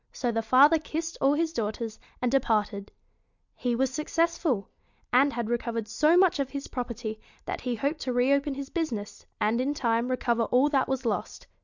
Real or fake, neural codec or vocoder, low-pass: real; none; 7.2 kHz